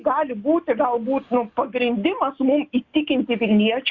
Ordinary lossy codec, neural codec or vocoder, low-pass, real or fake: Opus, 64 kbps; none; 7.2 kHz; real